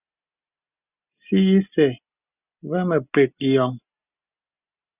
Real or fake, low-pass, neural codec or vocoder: real; 3.6 kHz; none